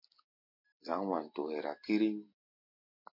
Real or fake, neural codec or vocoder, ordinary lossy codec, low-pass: real; none; MP3, 32 kbps; 5.4 kHz